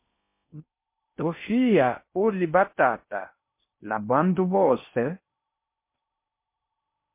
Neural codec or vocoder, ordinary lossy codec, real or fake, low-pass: codec, 16 kHz in and 24 kHz out, 0.6 kbps, FocalCodec, streaming, 4096 codes; MP3, 24 kbps; fake; 3.6 kHz